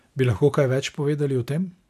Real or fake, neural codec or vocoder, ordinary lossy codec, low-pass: real; none; none; 14.4 kHz